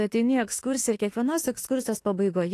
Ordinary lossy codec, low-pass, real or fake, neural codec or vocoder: AAC, 48 kbps; 14.4 kHz; fake; autoencoder, 48 kHz, 32 numbers a frame, DAC-VAE, trained on Japanese speech